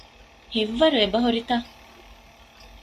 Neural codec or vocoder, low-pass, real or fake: none; 14.4 kHz; real